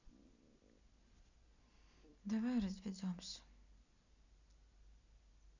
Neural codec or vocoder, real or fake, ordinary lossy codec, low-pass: none; real; none; 7.2 kHz